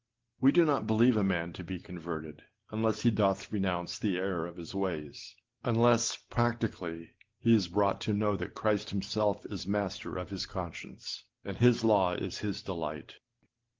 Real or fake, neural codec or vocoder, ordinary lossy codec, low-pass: real; none; Opus, 16 kbps; 7.2 kHz